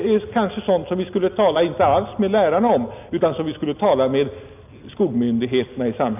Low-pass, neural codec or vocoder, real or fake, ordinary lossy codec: 3.6 kHz; none; real; none